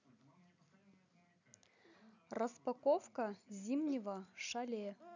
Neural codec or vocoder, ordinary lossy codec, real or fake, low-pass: none; none; real; 7.2 kHz